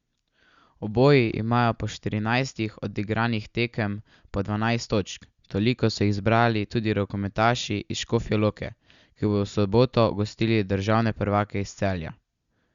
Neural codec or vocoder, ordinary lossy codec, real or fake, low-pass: none; Opus, 64 kbps; real; 7.2 kHz